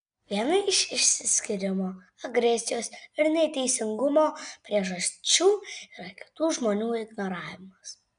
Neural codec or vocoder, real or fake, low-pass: none; real; 9.9 kHz